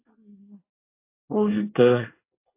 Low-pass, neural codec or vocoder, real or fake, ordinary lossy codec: 3.6 kHz; codec, 24 kHz, 1 kbps, SNAC; fake; AAC, 32 kbps